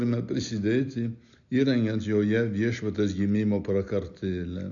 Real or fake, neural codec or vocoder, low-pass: real; none; 7.2 kHz